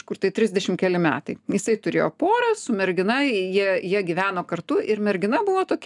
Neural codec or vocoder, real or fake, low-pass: none; real; 10.8 kHz